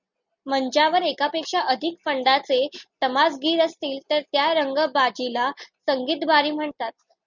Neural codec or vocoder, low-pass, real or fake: none; 7.2 kHz; real